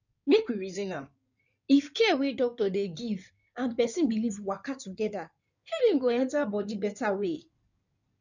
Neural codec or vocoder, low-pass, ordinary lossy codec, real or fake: codec, 16 kHz in and 24 kHz out, 2.2 kbps, FireRedTTS-2 codec; 7.2 kHz; none; fake